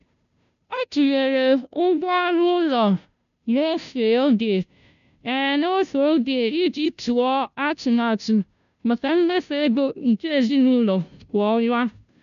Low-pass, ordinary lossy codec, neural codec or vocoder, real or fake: 7.2 kHz; none; codec, 16 kHz, 0.5 kbps, FunCodec, trained on Chinese and English, 25 frames a second; fake